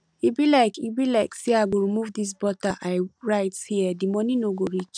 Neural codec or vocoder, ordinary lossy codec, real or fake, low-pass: none; none; real; 9.9 kHz